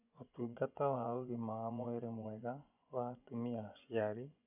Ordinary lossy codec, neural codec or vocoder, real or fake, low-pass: none; vocoder, 24 kHz, 100 mel bands, Vocos; fake; 3.6 kHz